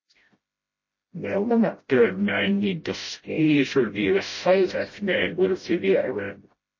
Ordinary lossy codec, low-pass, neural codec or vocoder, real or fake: MP3, 32 kbps; 7.2 kHz; codec, 16 kHz, 0.5 kbps, FreqCodec, smaller model; fake